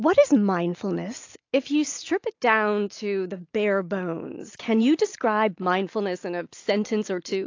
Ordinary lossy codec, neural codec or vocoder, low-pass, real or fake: AAC, 48 kbps; none; 7.2 kHz; real